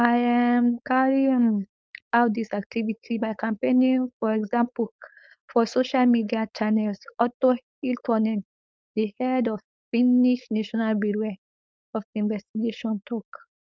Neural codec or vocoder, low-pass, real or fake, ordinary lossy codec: codec, 16 kHz, 4.8 kbps, FACodec; none; fake; none